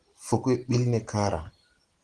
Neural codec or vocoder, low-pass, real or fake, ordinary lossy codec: none; 9.9 kHz; real; Opus, 16 kbps